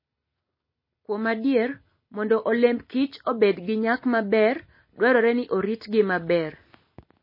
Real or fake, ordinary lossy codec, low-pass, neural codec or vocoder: real; MP3, 24 kbps; 5.4 kHz; none